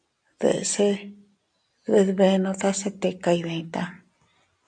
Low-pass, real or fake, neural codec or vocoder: 9.9 kHz; real; none